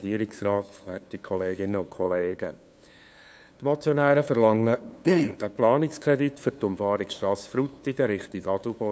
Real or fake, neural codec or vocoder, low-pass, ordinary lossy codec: fake; codec, 16 kHz, 2 kbps, FunCodec, trained on LibriTTS, 25 frames a second; none; none